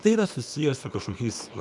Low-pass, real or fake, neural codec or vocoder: 10.8 kHz; fake; codec, 24 kHz, 0.9 kbps, WavTokenizer, small release